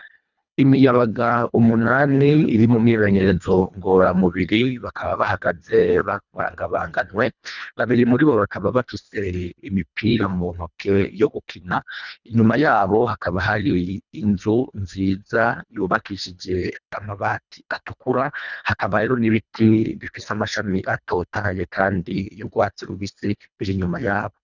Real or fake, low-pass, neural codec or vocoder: fake; 7.2 kHz; codec, 24 kHz, 1.5 kbps, HILCodec